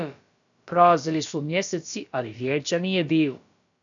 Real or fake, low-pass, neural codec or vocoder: fake; 7.2 kHz; codec, 16 kHz, about 1 kbps, DyCAST, with the encoder's durations